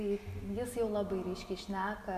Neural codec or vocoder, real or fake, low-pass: none; real; 14.4 kHz